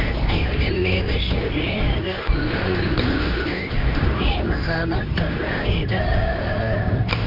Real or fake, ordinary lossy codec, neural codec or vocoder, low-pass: fake; none; codec, 24 kHz, 0.9 kbps, WavTokenizer, medium speech release version 2; 5.4 kHz